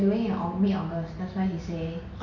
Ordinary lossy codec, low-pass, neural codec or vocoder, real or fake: none; 7.2 kHz; none; real